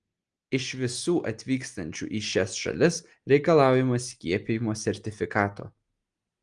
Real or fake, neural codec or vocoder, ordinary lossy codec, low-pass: real; none; Opus, 24 kbps; 9.9 kHz